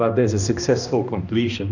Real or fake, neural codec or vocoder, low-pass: fake; codec, 16 kHz, 1 kbps, X-Codec, HuBERT features, trained on balanced general audio; 7.2 kHz